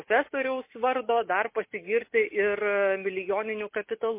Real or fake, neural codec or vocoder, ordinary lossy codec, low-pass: real; none; MP3, 24 kbps; 3.6 kHz